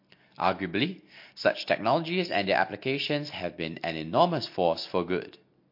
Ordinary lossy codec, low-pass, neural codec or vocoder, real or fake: MP3, 32 kbps; 5.4 kHz; none; real